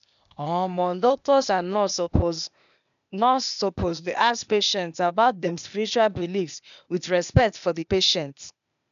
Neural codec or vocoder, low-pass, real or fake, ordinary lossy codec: codec, 16 kHz, 0.8 kbps, ZipCodec; 7.2 kHz; fake; none